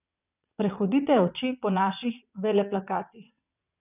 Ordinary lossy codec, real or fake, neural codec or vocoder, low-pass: none; fake; vocoder, 22.05 kHz, 80 mel bands, WaveNeXt; 3.6 kHz